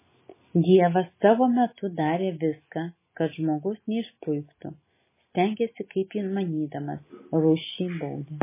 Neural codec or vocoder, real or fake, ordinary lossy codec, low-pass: none; real; MP3, 16 kbps; 3.6 kHz